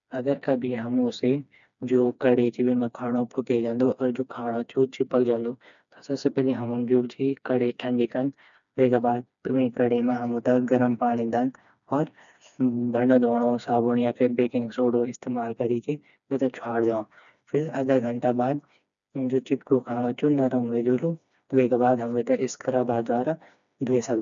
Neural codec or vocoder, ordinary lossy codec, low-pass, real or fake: codec, 16 kHz, 2 kbps, FreqCodec, smaller model; none; 7.2 kHz; fake